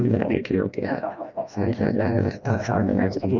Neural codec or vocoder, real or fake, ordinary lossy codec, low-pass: codec, 16 kHz, 1 kbps, FreqCodec, smaller model; fake; none; 7.2 kHz